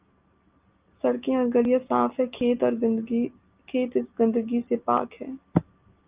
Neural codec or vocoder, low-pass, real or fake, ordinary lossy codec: none; 3.6 kHz; real; Opus, 24 kbps